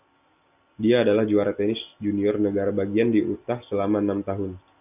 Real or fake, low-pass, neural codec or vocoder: real; 3.6 kHz; none